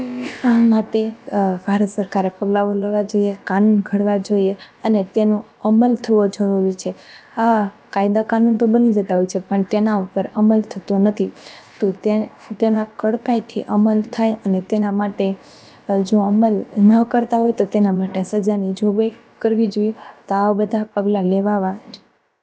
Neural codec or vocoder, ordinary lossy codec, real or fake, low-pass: codec, 16 kHz, about 1 kbps, DyCAST, with the encoder's durations; none; fake; none